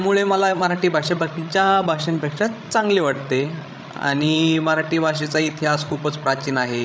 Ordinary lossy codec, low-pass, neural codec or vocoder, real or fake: none; none; codec, 16 kHz, 16 kbps, FreqCodec, larger model; fake